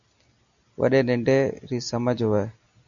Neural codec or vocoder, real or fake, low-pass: none; real; 7.2 kHz